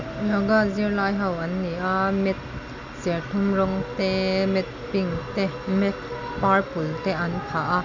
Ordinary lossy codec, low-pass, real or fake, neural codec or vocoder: none; 7.2 kHz; real; none